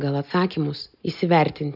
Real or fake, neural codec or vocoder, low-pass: real; none; 5.4 kHz